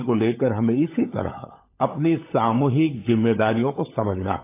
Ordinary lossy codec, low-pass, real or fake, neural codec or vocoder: AAC, 24 kbps; 3.6 kHz; fake; codec, 16 kHz, 16 kbps, FunCodec, trained on LibriTTS, 50 frames a second